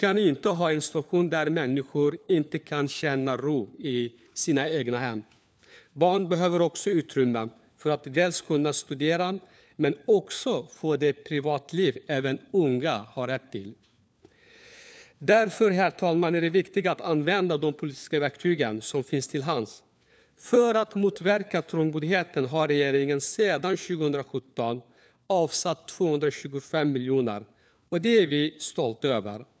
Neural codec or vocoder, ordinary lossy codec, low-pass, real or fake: codec, 16 kHz, 4 kbps, FunCodec, trained on LibriTTS, 50 frames a second; none; none; fake